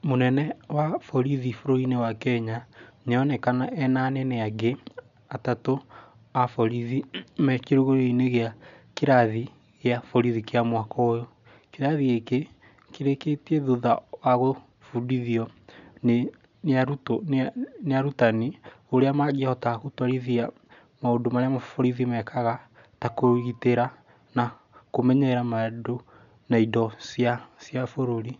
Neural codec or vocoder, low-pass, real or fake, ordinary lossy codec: none; 7.2 kHz; real; none